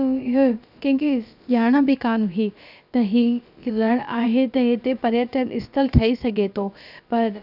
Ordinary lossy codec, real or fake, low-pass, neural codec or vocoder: none; fake; 5.4 kHz; codec, 16 kHz, about 1 kbps, DyCAST, with the encoder's durations